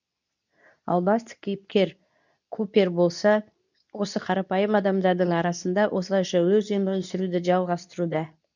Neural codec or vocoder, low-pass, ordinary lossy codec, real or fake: codec, 24 kHz, 0.9 kbps, WavTokenizer, medium speech release version 2; 7.2 kHz; none; fake